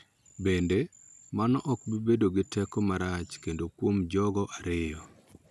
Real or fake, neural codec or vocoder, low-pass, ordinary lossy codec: real; none; none; none